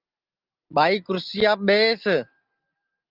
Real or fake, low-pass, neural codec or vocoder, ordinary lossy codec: real; 5.4 kHz; none; Opus, 24 kbps